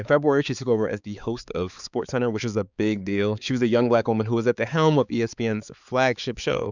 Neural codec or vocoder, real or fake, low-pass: codec, 16 kHz, 4 kbps, X-Codec, HuBERT features, trained on balanced general audio; fake; 7.2 kHz